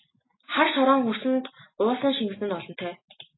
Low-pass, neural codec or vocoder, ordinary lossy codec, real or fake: 7.2 kHz; none; AAC, 16 kbps; real